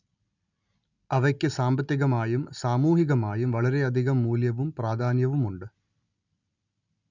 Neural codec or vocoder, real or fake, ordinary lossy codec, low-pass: none; real; none; 7.2 kHz